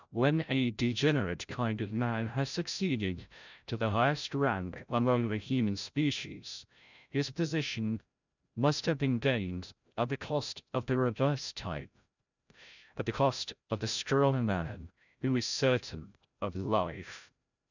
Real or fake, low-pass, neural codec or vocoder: fake; 7.2 kHz; codec, 16 kHz, 0.5 kbps, FreqCodec, larger model